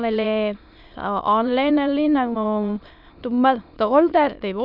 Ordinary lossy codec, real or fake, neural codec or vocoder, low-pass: none; fake; autoencoder, 22.05 kHz, a latent of 192 numbers a frame, VITS, trained on many speakers; 5.4 kHz